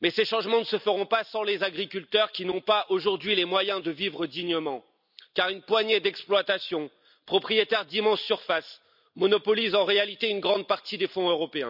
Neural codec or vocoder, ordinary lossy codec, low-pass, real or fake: none; none; 5.4 kHz; real